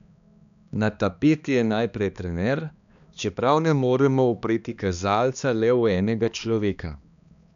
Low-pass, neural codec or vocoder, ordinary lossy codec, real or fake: 7.2 kHz; codec, 16 kHz, 2 kbps, X-Codec, HuBERT features, trained on balanced general audio; none; fake